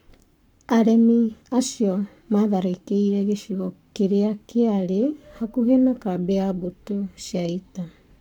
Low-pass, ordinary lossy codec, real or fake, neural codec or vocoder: 19.8 kHz; none; fake; codec, 44.1 kHz, 7.8 kbps, Pupu-Codec